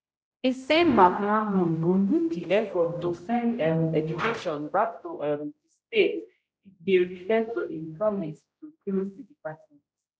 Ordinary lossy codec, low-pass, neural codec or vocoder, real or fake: none; none; codec, 16 kHz, 0.5 kbps, X-Codec, HuBERT features, trained on general audio; fake